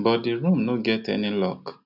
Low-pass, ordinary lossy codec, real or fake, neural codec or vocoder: 5.4 kHz; none; real; none